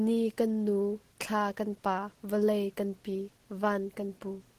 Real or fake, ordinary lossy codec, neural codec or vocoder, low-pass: real; Opus, 16 kbps; none; 14.4 kHz